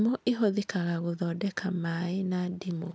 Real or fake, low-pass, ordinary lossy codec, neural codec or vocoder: real; none; none; none